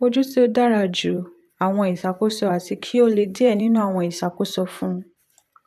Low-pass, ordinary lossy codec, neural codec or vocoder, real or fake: 14.4 kHz; none; vocoder, 44.1 kHz, 128 mel bands, Pupu-Vocoder; fake